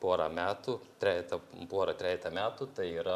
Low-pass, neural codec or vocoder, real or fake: 14.4 kHz; none; real